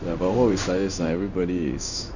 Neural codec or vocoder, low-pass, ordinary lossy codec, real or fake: codec, 16 kHz in and 24 kHz out, 1 kbps, XY-Tokenizer; 7.2 kHz; none; fake